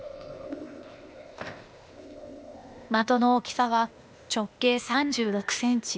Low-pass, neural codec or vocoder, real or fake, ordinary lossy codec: none; codec, 16 kHz, 0.8 kbps, ZipCodec; fake; none